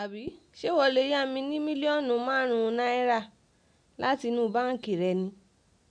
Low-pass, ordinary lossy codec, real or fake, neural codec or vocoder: 9.9 kHz; none; real; none